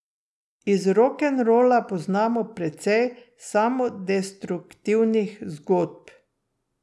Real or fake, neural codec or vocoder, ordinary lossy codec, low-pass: real; none; none; none